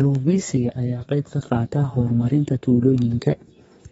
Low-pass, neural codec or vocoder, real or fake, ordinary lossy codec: 14.4 kHz; codec, 32 kHz, 1.9 kbps, SNAC; fake; AAC, 24 kbps